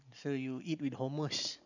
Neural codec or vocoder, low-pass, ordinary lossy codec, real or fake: vocoder, 44.1 kHz, 128 mel bands every 512 samples, BigVGAN v2; 7.2 kHz; none; fake